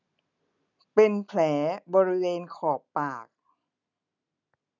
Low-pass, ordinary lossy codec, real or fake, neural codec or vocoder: 7.2 kHz; none; real; none